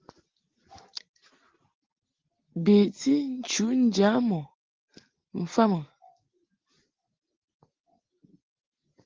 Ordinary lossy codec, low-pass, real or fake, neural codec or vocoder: Opus, 16 kbps; 7.2 kHz; real; none